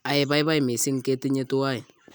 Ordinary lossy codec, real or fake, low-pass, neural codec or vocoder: none; real; none; none